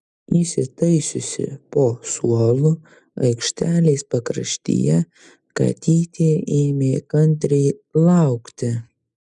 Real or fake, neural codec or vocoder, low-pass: real; none; 10.8 kHz